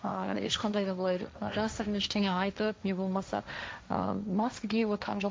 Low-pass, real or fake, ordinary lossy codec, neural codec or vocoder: none; fake; none; codec, 16 kHz, 1.1 kbps, Voila-Tokenizer